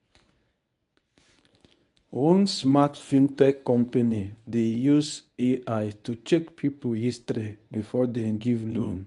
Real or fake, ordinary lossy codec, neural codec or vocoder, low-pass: fake; none; codec, 24 kHz, 0.9 kbps, WavTokenizer, medium speech release version 1; 10.8 kHz